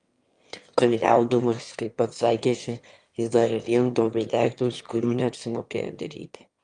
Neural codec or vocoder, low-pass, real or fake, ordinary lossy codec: autoencoder, 22.05 kHz, a latent of 192 numbers a frame, VITS, trained on one speaker; 9.9 kHz; fake; Opus, 32 kbps